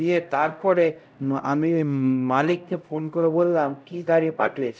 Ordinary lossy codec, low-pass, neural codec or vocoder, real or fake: none; none; codec, 16 kHz, 0.5 kbps, X-Codec, HuBERT features, trained on LibriSpeech; fake